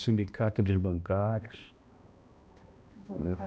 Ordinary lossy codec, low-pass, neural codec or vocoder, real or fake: none; none; codec, 16 kHz, 1 kbps, X-Codec, HuBERT features, trained on balanced general audio; fake